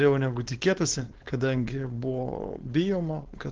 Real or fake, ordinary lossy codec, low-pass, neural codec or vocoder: fake; Opus, 16 kbps; 7.2 kHz; codec, 16 kHz, 4.8 kbps, FACodec